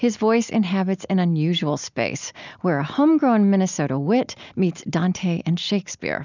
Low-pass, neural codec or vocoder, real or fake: 7.2 kHz; none; real